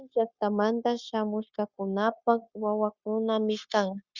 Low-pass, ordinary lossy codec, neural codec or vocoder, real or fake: 7.2 kHz; Opus, 64 kbps; codec, 16 kHz, 0.9 kbps, LongCat-Audio-Codec; fake